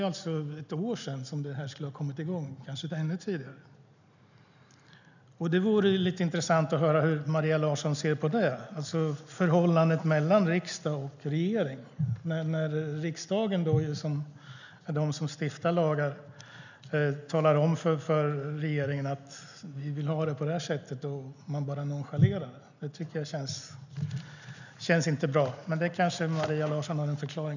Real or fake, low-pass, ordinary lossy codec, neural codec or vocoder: real; 7.2 kHz; none; none